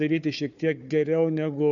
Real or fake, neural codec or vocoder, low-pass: fake; codec, 16 kHz, 4 kbps, FunCodec, trained on Chinese and English, 50 frames a second; 7.2 kHz